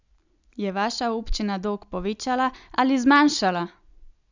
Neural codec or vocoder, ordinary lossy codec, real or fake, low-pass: none; none; real; 7.2 kHz